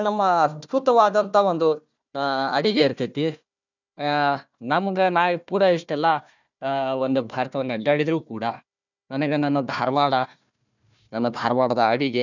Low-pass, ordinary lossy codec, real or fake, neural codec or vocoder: 7.2 kHz; none; fake; codec, 16 kHz, 1 kbps, FunCodec, trained on Chinese and English, 50 frames a second